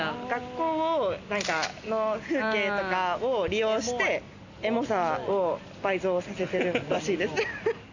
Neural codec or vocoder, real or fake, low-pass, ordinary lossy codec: none; real; 7.2 kHz; none